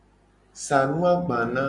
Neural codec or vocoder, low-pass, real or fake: none; 10.8 kHz; real